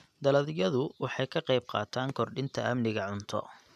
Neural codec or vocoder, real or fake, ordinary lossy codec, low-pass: none; real; none; 14.4 kHz